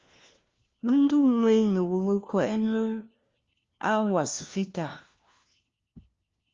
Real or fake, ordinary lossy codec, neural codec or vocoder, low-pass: fake; Opus, 32 kbps; codec, 16 kHz, 1 kbps, FunCodec, trained on LibriTTS, 50 frames a second; 7.2 kHz